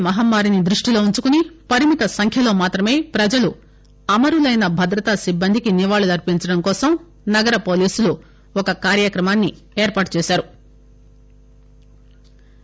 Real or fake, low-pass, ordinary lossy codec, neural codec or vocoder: real; none; none; none